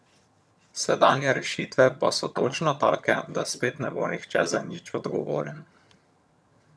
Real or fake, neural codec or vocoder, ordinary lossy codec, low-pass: fake; vocoder, 22.05 kHz, 80 mel bands, HiFi-GAN; none; none